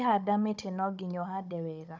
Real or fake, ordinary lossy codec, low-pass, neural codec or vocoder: fake; none; none; codec, 16 kHz, 16 kbps, FunCodec, trained on Chinese and English, 50 frames a second